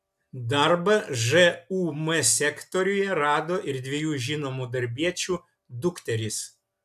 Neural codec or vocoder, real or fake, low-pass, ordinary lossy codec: none; real; 14.4 kHz; AAC, 96 kbps